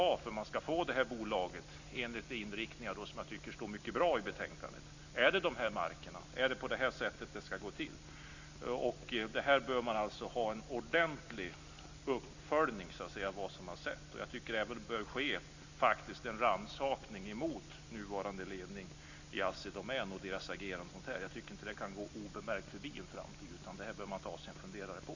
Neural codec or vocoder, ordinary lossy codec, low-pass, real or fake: none; Opus, 64 kbps; 7.2 kHz; real